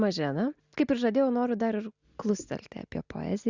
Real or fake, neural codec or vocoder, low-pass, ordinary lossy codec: real; none; 7.2 kHz; Opus, 64 kbps